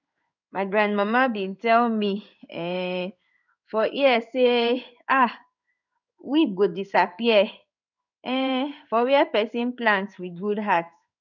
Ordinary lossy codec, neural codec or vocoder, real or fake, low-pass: none; codec, 16 kHz in and 24 kHz out, 1 kbps, XY-Tokenizer; fake; 7.2 kHz